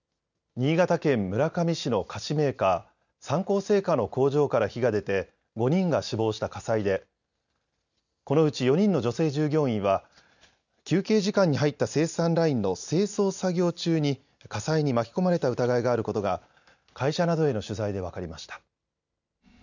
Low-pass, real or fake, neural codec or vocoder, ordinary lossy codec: 7.2 kHz; real; none; none